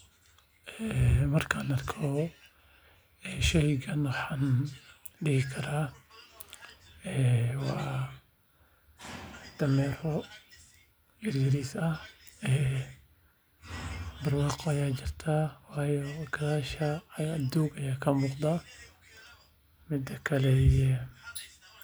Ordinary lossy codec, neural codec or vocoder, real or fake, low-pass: none; none; real; none